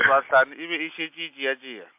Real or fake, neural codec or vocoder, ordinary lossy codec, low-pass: real; none; MP3, 32 kbps; 3.6 kHz